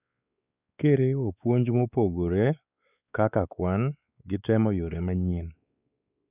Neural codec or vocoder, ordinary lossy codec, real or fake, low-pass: codec, 16 kHz, 4 kbps, X-Codec, WavLM features, trained on Multilingual LibriSpeech; none; fake; 3.6 kHz